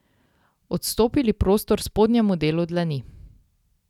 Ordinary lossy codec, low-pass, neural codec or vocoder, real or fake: none; 19.8 kHz; none; real